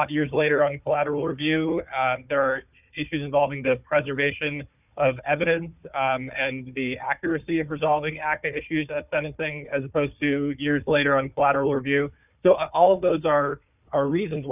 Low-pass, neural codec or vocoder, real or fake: 3.6 kHz; codec, 16 kHz, 4 kbps, FunCodec, trained on Chinese and English, 50 frames a second; fake